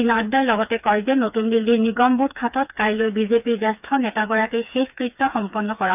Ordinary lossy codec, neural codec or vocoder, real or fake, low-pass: none; codec, 16 kHz, 4 kbps, FreqCodec, smaller model; fake; 3.6 kHz